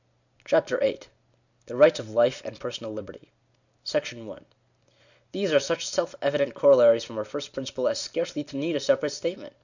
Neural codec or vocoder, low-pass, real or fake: none; 7.2 kHz; real